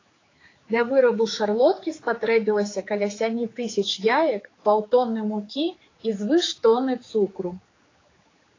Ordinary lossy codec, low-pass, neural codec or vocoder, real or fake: AAC, 32 kbps; 7.2 kHz; codec, 16 kHz, 4 kbps, X-Codec, HuBERT features, trained on general audio; fake